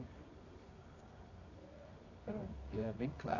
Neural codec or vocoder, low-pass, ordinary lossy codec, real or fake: codec, 24 kHz, 0.9 kbps, WavTokenizer, medium music audio release; 7.2 kHz; AAC, 32 kbps; fake